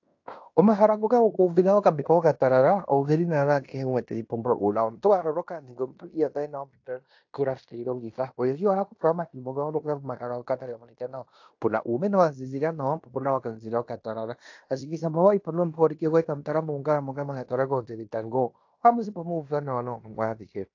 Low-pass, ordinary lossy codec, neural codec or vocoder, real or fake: 7.2 kHz; AAC, 48 kbps; codec, 16 kHz in and 24 kHz out, 0.9 kbps, LongCat-Audio-Codec, fine tuned four codebook decoder; fake